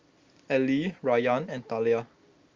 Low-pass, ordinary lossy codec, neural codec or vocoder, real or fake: 7.2 kHz; Opus, 32 kbps; none; real